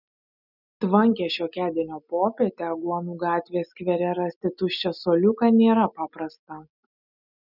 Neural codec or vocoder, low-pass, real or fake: none; 5.4 kHz; real